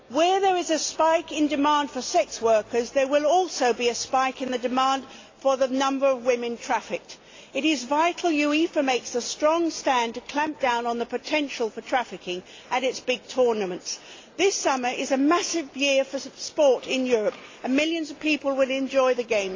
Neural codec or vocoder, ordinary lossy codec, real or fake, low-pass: none; AAC, 32 kbps; real; 7.2 kHz